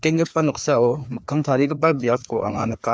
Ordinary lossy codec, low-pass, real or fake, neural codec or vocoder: none; none; fake; codec, 16 kHz, 2 kbps, FreqCodec, larger model